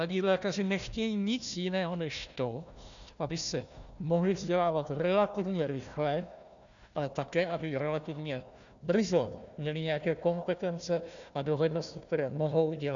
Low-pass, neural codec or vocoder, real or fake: 7.2 kHz; codec, 16 kHz, 1 kbps, FunCodec, trained on Chinese and English, 50 frames a second; fake